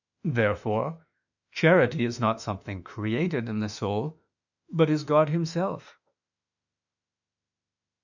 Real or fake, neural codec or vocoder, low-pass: fake; autoencoder, 48 kHz, 32 numbers a frame, DAC-VAE, trained on Japanese speech; 7.2 kHz